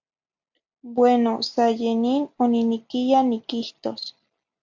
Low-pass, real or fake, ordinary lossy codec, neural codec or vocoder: 7.2 kHz; real; MP3, 64 kbps; none